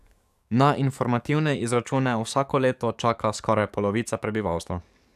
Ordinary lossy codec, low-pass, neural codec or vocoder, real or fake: none; 14.4 kHz; codec, 44.1 kHz, 7.8 kbps, DAC; fake